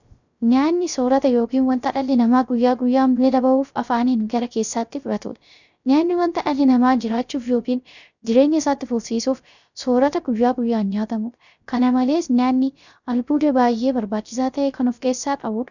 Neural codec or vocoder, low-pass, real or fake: codec, 16 kHz, 0.3 kbps, FocalCodec; 7.2 kHz; fake